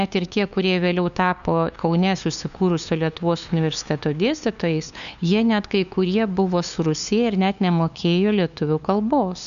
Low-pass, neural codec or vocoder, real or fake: 7.2 kHz; codec, 16 kHz, 2 kbps, FunCodec, trained on LibriTTS, 25 frames a second; fake